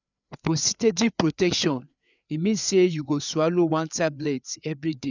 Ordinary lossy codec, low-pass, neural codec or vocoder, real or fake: none; 7.2 kHz; codec, 16 kHz, 4 kbps, FreqCodec, larger model; fake